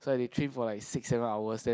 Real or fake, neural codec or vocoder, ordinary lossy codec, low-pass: real; none; none; none